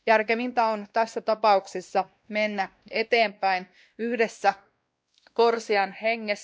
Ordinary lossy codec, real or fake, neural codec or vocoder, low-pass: none; fake; codec, 16 kHz, 1 kbps, X-Codec, WavLM features, trained on Multilingual LibriSpeech; none